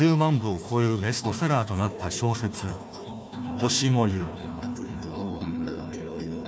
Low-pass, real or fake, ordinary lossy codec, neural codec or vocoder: none; fake; none; codec, 16 kHz, 1 kbps, FunCodec, trained on Chinese and English, 50 frames a second